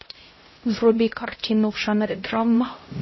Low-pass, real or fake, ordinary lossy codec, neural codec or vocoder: 7.2 kHz; fake; MP3, 24 kbps; codec, 16 kHz, 0.5 kbps, X-Codec, HuBERT features, trained on LibriSpeech